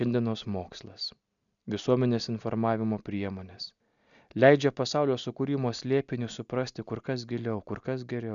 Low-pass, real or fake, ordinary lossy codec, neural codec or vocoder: 7.2 kHz; real; MP3, 96 kbps; none